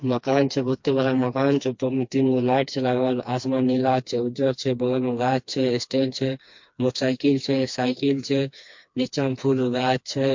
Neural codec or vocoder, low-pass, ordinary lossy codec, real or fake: codec, 16 kHz, 2 kbps, FreqCodec, smaller model; 7.2 kHz; MP3, 48 kbps; fake